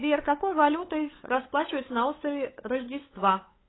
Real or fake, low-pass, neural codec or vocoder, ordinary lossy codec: fake; 7.2 kHz; codec, 16 kHz, 8 kbps, FunCodec, trained on LibriTTS, 25 frames a second; AAC, 16 kbps